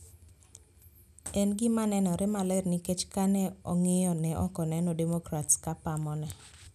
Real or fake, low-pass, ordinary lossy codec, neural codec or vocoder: real; 14.4 kHz; none; none